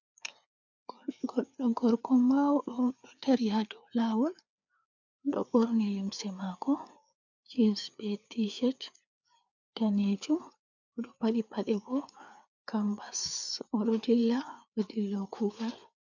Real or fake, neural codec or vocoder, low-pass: fake; codec, 16 kHz, 4 kbps, FreqCodec, larger model; 7.2 kHz